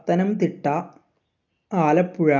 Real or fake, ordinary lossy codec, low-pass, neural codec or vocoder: real; none; 7.2 kHz; none